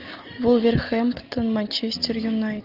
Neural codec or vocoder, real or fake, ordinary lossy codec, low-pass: none; real; Opus, 24 kbps; 5.4 kHz